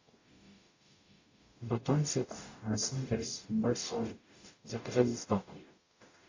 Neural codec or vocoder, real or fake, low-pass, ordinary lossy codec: codec, 44.1 kHz, 0.9 kbps, DAC; fake; 7.2 kHz; AAC, 48 kbps